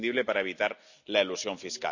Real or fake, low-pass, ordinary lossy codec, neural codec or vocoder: real; 7.2 kHz; none; none